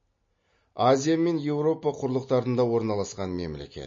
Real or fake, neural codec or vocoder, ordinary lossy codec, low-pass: real; none; MP3, 32 kbps; 7.2 kHz